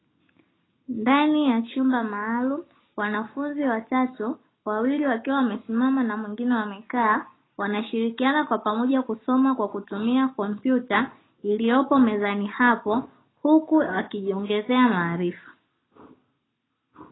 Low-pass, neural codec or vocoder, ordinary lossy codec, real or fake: 7.2 kHz; none; AAC, 16 kbps; real